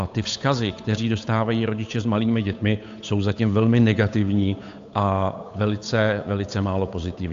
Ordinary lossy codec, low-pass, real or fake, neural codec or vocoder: AAC, 64 kbps; 7.2 kHz; fake; codec, 16 kHz, 8 kbps, FunCodec, trained on Chinese and English, 25 frames a second